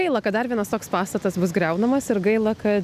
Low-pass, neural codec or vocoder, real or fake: 14.4 kHz; none; real